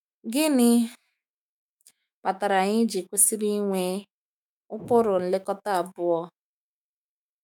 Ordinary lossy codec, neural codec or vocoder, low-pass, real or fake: none; autoencoder, 48 kHz, 128 numbers a frame, DAC-VAE, trained on Japanese speech; none; fake